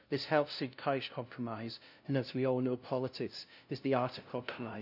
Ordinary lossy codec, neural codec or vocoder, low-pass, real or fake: none; codec, 16 kHz, 0.5 kbps, FunCodec, trained on LibriTTS, 25 frames a second; 5.4 kHz; fake